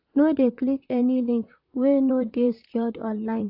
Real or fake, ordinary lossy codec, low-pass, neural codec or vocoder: fake; AAC, 48 kbps; 5.4 kHz; vocoder, 44.1 kHz, 128 mel bands, Pupu-Vocoder